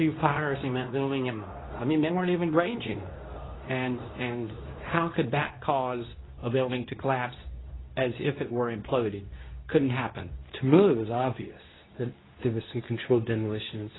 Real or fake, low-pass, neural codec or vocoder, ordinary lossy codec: fake; 7.2 kHz; codec, 24 kHz, 0.9 kbps, WavTokenizer, medium speech release version 2; AAC, 16 kbps